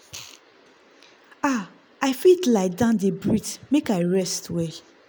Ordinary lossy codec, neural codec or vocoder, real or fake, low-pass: none; none; real; none